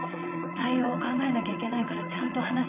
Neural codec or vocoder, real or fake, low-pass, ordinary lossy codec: vocoder, 22.05 kHz, 80 mel bands, Vocos; fake; 3.6 kHz; none